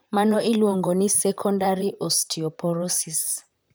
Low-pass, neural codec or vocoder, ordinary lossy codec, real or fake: none; vocoder, 44.1 kHz, 128 mel bands, Pupu-Vocoder; none; fake